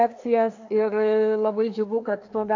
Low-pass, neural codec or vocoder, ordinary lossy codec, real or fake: 7.2 kHz; codec, 24 kHz, 1 kbps, SNAC; AAC, 48 kbps; fake